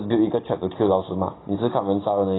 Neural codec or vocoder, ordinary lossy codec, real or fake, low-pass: none; AAC, 16 kbps; real; 7.2 kHz